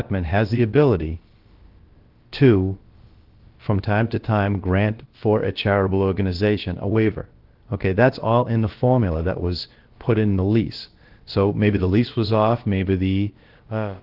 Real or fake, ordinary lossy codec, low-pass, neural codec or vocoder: fake; Opus, 16 kbps; 5.4 kHz; codec, 16 kHz, about 1 kbps, DyCAST, with the encoder's durations